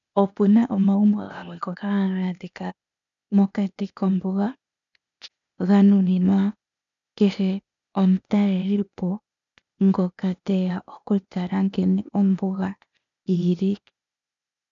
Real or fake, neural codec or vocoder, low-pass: fake; codec, 16 kHz, 0.8 kbps, ZipCodec; 7.2 kHz